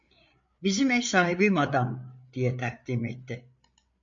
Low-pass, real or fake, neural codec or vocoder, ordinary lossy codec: 7.2 kHz; fake; codec, 16 kHz, 8 kbps, FreqCodec, larger model; MP3, 48 kbps